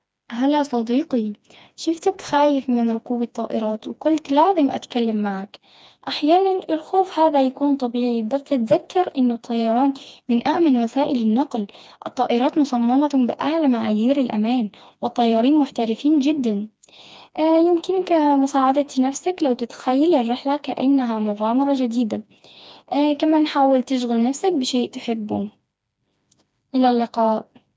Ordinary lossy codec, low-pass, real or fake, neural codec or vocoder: none; none; fake; codec, 16 kHz, 2 kbps, FreqCodec, smaller model